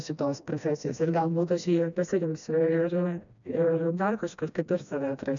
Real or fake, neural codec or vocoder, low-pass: fake; codec, 16 kHz, 1 kbps, FreqCodec, smaller model; 7.2 kHz